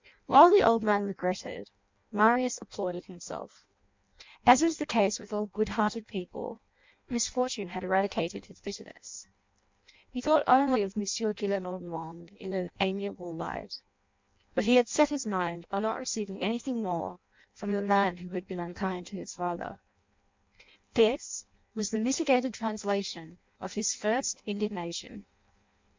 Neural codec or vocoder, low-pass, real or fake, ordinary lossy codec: codec, 16 kHz in and 24 kHz out, 0.6 kbps, FireRedTTS-2 codec; 7.2 kHz; fake; MP3, 64 kbps